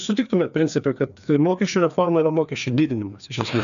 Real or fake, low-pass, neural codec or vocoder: fake; 7.2 kHz; codec, 16 kHz, 2 kbps, FreqCodec, larger model